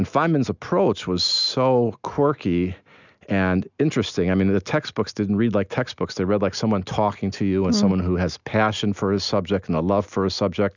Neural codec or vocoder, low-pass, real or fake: none; 7.2 kHz; real